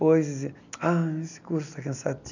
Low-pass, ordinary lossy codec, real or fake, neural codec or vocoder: 7.2 kHz; none; real; none